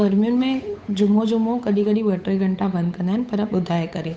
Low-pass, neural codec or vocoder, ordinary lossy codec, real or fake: none; codec, 16 kHz, 8 kbps, FunCodec, trained on Chinese and English, 25 frames a second; none; fake